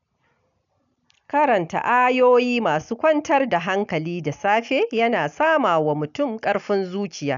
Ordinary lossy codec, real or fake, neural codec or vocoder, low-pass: none; real; none; 7.2 kHz